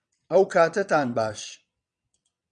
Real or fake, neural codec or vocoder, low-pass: fake; vocoder, 22.05 kHz, 80 mel bands, WaveNeXt; 9.9 kHz